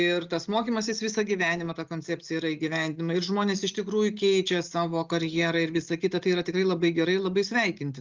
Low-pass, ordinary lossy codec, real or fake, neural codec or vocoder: 7.2 kHz; Opus, 32 kbps; real; none